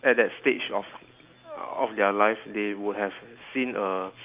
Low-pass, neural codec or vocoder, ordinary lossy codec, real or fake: 3.6 kHz; none; Opus, 32 kbps; real